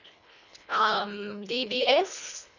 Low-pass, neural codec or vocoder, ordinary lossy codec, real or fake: 7.2 kHz; codec, 24 kHz, 1.5 kbps, HILCodec; none; fake